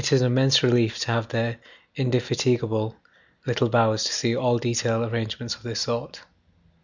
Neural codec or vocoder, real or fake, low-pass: none; real; 7.2 kHz